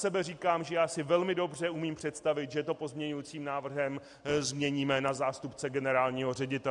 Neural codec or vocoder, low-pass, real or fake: none; 10.8 kHz; real